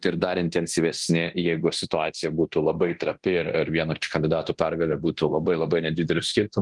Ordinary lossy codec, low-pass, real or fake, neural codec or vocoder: Opus, 24 kbps; 10.8 kHz; fake; codec, 24 kHz, 0.9 kbps, DualCodec